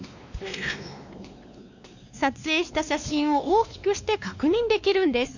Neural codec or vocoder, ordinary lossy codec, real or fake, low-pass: codec, 16 kHz, 2 kbps, X-Codec, WavLM features, trained on Multilingual LibriSpeech; none; fake; 7.2 kHz